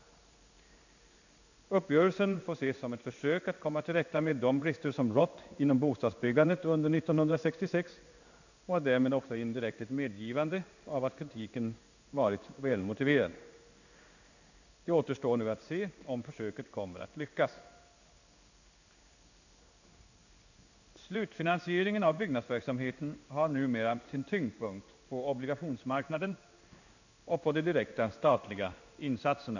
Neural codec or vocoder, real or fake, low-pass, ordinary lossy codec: codec, 16 kHz in and 24 kHz out, 1 kbps, XY-Tokenizer; fake; 7.2 kHz; none